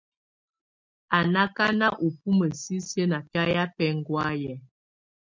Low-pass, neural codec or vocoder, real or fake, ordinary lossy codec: 7.2 kHz; none; real; MP3, 64 kbps